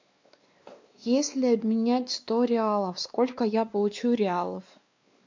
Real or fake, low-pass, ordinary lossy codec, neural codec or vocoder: fake; 7.2 kHz; MP3, 64 kbps; codec, 16 kHz, 2 kbps, X-Codec, WavLM features, trained on Multilingual LibriSpeech